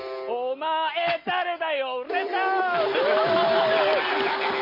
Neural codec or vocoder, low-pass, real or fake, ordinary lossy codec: none; 5.4 kHz; real; MP3, 24 kbps